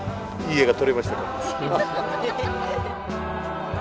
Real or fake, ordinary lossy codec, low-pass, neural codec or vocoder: real; none; none; none